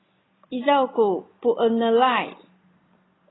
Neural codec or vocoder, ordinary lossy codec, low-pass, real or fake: none; AAC, 16 kbps; 7.2 kHz; real